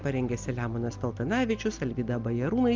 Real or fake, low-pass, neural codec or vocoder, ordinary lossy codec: real; 7.2 kHz; none; Opus, 24 kbps